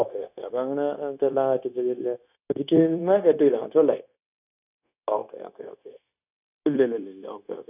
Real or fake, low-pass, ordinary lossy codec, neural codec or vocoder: fake; 3.6 kHz; none; codec, 16 kHz, 0.9 kbps, LongCat-Audio-Codec